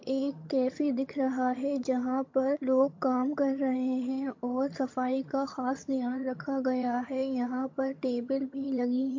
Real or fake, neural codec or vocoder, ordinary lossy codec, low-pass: fake; vocoder, 22.05 kHz, 80 mel bands, HiFi-GAN; MP3, 48 kbps; 7.2 kHz